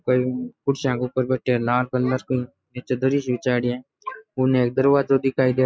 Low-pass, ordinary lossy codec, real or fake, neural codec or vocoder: none; none; real; none